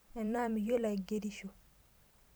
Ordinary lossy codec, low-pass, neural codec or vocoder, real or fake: none; none; vocoder, 44.1 kHz, 128 mel bands every 512 samples, BigVGAN v2; fake